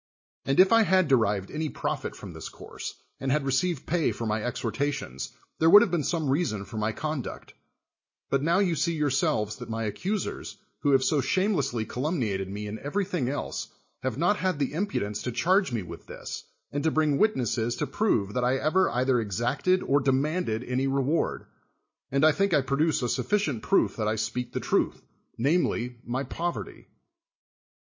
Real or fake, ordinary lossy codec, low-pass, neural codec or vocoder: real; MP3, 32 kbps; 7.2 kHz; none